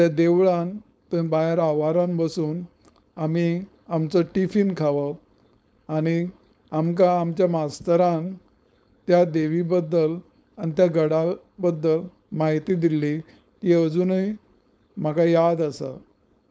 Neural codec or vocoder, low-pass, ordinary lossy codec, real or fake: codec, 16 kHz, 4.8 kbps, FACodec; none; none; fake